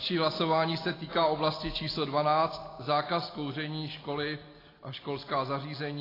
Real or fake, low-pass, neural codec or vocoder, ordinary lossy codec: real; 5.4 kHz; none; AAC, 24 kbps